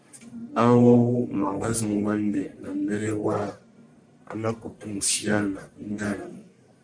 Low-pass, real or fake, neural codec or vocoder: 9.9 kHz; fake; codec, 44.1 kHz, 1.7 kbps, Pupu-Codec